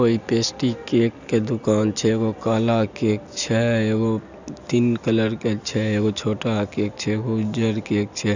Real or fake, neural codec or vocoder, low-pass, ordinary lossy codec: real; none; 7.2 kHz; none